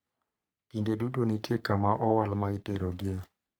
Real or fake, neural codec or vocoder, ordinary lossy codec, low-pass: fake; codec, 44.1 kHz, 3.4 kbps, Pupu-Codec; none; none